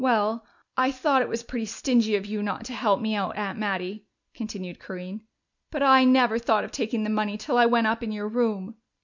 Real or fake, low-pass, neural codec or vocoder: real; 7.2 kHz; none